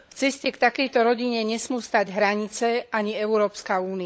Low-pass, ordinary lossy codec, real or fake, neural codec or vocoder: none; none; fake; codec, 16 kHz, 16 kbps, FunCodec, trained on LibriTTS, 50 frames a second